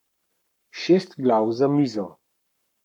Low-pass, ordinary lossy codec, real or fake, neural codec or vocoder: 19.8 kHz; none; fake; codec, 44.1 kHz, 7.8 kbps, Pupu-Codec